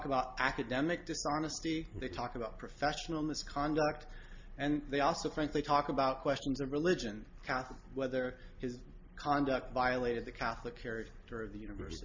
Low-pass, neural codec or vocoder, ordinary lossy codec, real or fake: 7.2 kHz; none; MP3, 48 kbps; real